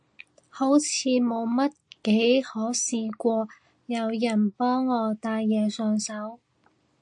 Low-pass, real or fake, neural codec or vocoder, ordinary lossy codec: 9.9 kHz; real; none; AAC, 64 kbps